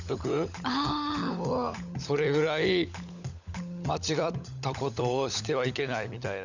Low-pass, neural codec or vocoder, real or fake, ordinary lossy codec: 7.2 kHz; codec, 16 kHz, 16 kbps, FunCodec, trained on LibriTTS, 50 frames a second; fake; none